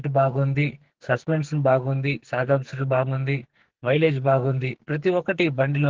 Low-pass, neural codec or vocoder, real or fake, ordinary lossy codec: 7.2 kHz; codec, 44.1 kHz, 2.6 kbps, SNAC; fake; Opus, 16 kbps